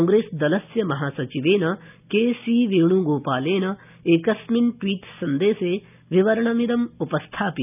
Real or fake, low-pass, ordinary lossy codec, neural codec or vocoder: real; 3.6 kHz; none; none